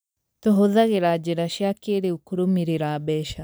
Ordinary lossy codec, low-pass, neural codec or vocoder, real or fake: none; none; none; real